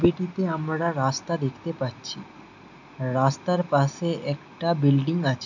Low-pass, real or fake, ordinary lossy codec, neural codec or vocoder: 7.2 kHz; real; none; none